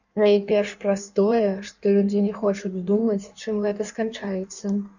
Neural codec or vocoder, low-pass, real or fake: codec, 16 kHz in and 24 kHz out, 1.1 kbps, FireRedTTS-2 codec; 7.2 kHz; fake